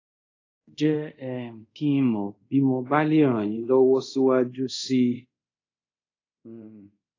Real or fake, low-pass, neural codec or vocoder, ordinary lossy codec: fake; 7.2 kHz; codec, 24 kHz, 0.5 kbps, DualCodec; AAC, 32 kbps